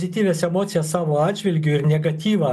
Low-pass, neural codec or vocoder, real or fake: 14.4 kHz; none; real